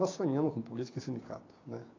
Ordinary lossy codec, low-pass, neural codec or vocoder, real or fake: AAC, 32 kbps; 7.2 kHz; codec, 16 kHz, 6 kbps, DAC; fake